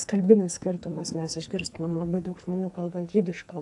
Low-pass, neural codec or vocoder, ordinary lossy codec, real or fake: 10.8 kHz; codec, 44.1 kHz, 2.6 kbps, SNAC; AAC, 64 kbps; fake